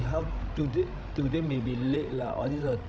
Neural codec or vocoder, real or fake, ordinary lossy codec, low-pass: codec, 16 kHz, 16 kbps, FreqCodec, larger model; fake; none; none